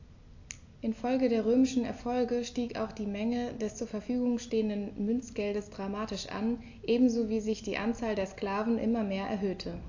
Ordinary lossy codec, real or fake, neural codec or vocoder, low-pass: AAC, 48 kbps; real; none; 7.2 kHz